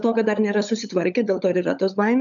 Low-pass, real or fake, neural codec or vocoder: 7.2 kHz; fake; codec, 16 kHz, 16 kbps, FunCodec, trained on LibriTTS, 50 frames a second